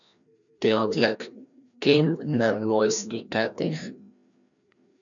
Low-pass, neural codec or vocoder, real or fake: 7.2 kHz; codec, 16 kHz, 1 kbps, FreqCodec, larger model; fake